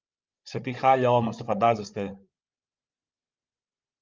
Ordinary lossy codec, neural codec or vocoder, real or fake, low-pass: Opus, 32 kbps; codec, 16 kHz, 16 kbps, FreqCodec, larger model; fake; 7.2 kHz